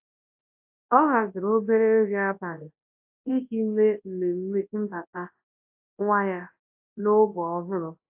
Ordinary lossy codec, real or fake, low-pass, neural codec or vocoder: AAC, 32 kbps; fake; 3.6 kHz; codec, 24 kHz, 0.9 kbps, WavTokenizer, large speech release